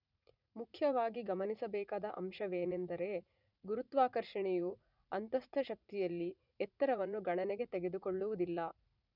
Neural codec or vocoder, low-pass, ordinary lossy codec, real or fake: vocoder, 44.1 kHz, 80 mel bands, Vocos; 5.4 kHz; none; fake